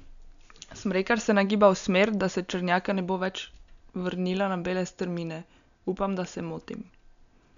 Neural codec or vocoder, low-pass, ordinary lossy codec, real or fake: none; 7.2 kHz; none; real